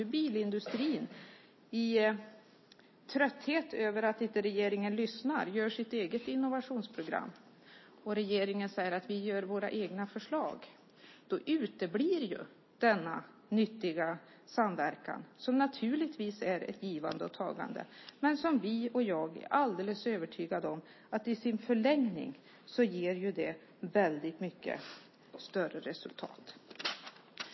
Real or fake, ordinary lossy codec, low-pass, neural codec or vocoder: real; MP3, 24 kbps; 7.2 kHz; none